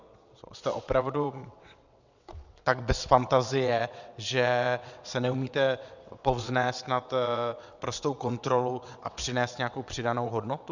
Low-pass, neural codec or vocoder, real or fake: 7.2 kHz; vocoder, 22.05 kHz, 80 mel bands, WaveNeXt; fake